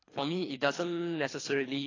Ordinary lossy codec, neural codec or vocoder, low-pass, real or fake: AAC, 32 kbps; codec, 24 kHz, 3 kbps, HILCodec; 7.2 kHz; fake